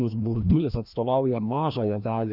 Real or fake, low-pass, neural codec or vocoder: fake; 5.4 kHz; codec, 16 kHz, 1 kbps, FreqCodec, larger model